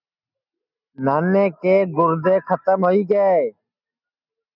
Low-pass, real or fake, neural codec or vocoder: 5.4 kHz; real; none